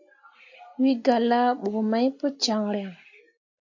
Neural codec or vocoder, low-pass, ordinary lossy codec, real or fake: none; 7.2 kHz; AAC, 48 kbps; real